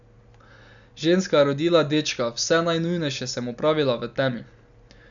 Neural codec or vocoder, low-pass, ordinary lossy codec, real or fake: none; 7.2 kHz; none; real